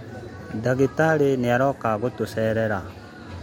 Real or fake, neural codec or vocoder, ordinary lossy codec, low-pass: real; none; MP3, 64 kbps; 19.8 kHz